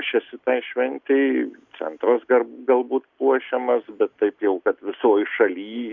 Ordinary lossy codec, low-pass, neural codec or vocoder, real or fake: Opus, 64 kbps; 7.2 kHz; none; real